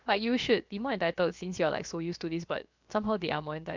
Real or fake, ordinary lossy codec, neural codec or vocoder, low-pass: fake; MP3, 64 kbps; codec, 16 kHz, about 1 kbps, DyCAST, with the encoder's durations; 7.2 kHz